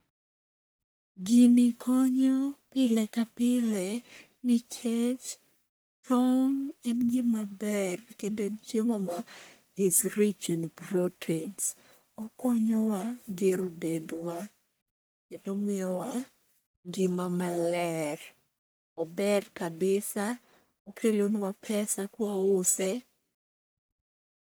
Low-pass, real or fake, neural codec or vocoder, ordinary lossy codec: none; fake; codec, 44.1 kHz, 1.7 kbps, Pupu-Codec; none